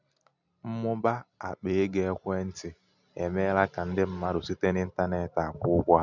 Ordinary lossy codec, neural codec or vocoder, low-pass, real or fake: none; none; 7.2 kHz; real